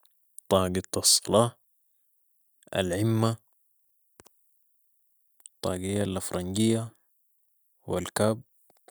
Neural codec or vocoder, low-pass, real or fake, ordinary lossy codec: none; none; real; none